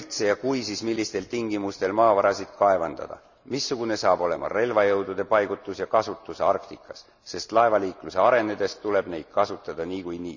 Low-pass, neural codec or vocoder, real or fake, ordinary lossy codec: 7.2 kHz; none; real; none